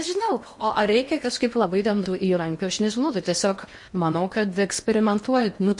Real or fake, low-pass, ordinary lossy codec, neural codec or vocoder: fake; 10.8 kHz; MP3, 48 kbps; codec, 16 kHz in and 24 kHz out, 0.8 kbps, FocalCodec, streaming, 65536 codes